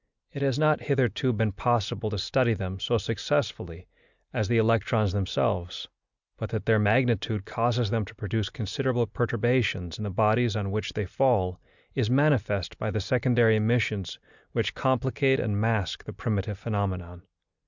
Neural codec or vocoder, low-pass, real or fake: none; 7.2 kHz; real